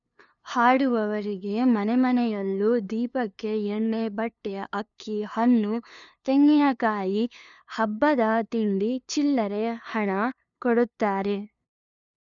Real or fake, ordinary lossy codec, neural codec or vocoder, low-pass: fake; none; codec, 16 kHz, 2 kbps, FunCodec, trained on LibriTTS, 25 frames a second; 7.2 kHz